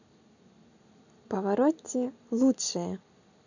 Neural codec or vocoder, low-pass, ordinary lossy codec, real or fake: none; 7.2 kHz; none; real